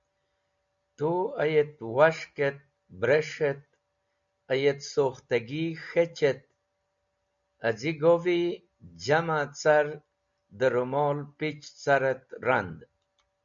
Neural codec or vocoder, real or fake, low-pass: none; real; 7.2 kHz